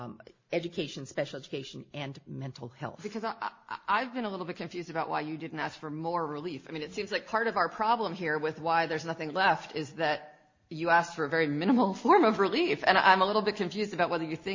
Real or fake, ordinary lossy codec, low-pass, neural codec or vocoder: real; MP3, 32 kbps; 7.2 kHz; none